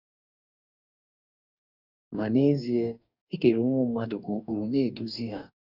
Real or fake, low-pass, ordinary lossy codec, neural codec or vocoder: fake; 5.4 kHz; none; codec, 44.1 kHz, 2.6 kbps, DAC